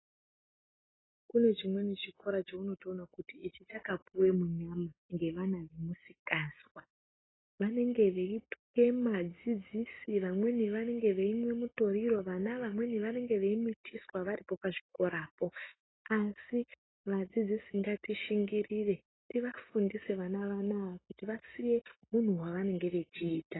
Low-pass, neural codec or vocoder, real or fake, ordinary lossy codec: 7.2 kHz; none; real; AAC, 16 kbps